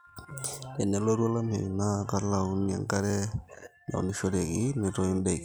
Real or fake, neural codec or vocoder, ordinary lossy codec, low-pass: real; none; none; none